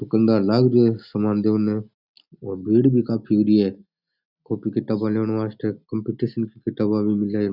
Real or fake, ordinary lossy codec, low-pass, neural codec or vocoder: real; none; 5.4 kHz; none